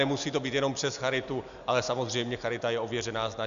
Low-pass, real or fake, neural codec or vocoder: 7.2 kHz; real; none